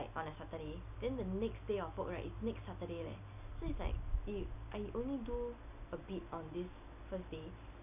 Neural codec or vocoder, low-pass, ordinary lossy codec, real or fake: none; 3.6 kHz; none; real